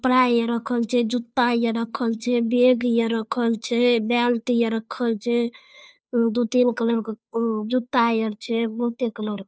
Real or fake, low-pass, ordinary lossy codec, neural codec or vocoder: fake; none; none; codec, 16 kHz, 2 kbps, FunCodec, trained on Chinese and English, 25 frames a second